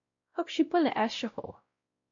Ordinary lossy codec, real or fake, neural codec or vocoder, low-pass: MP3, 48 kbps; fake; codec, 16 kHz, 0.5 kbps, X-Codec, WavLM features, trained on Multilingual LibriSpeech; 7.2 kHz